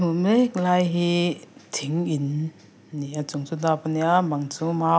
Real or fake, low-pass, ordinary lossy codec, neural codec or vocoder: real; none; none; none